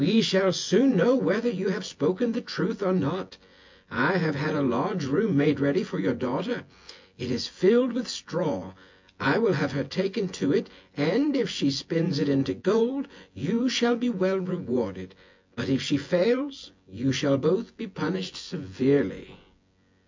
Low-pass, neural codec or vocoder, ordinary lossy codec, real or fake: 7.2 kHz; vocoder, 24 kHz, 100 mel bands, Vocos; MP3, 48 kbps; fake